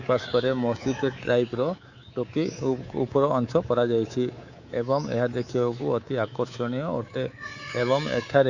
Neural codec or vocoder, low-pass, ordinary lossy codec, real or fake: codec, 24 kHz, 3.1 kbps, DualCodec; 7.2 kHz; Opus, 64 kbps; fake